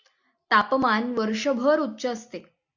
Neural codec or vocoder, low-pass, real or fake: none; 7.2 kHz; real